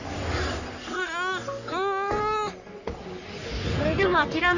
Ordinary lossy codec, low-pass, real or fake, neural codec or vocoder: none; 7.2 kHz; fake; codec, 44.1 kHz, 3.4 kbps, Pupu-Codec